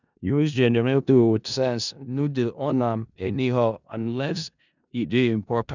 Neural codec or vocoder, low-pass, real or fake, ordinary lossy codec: codec, 16 kHz in and 24 kHz out, 0.4 kbps, LongCat-Audio-Codec, four codebook decoder; 7.2 kHz; fake; none